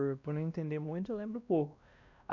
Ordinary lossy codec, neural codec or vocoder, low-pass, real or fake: MP3, 48 kbps; codec, 16 kHz, 1 kbps, X-Codec, WavLM features, trained on Multilingual LibriSpeech; 7.2 kHz; fake